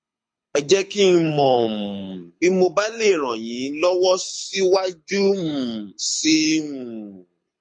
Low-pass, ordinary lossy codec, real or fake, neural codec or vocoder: 9.9 kHz; MP3, 48 kbps; fake; codec, 24 kHz, 6 kbps, HILCodec